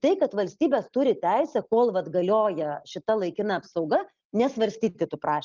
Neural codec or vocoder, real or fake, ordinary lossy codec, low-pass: none; real; Opus, 24 kbps; 7.2 kHz